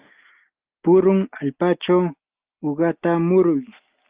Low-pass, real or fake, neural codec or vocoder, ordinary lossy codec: 3.6 kHz; real; none; Opus, 24 kbps